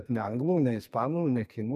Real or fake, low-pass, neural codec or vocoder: fake; 14.4 kHz; codec, 44.1 kHz, 2.6 kbps, SNAC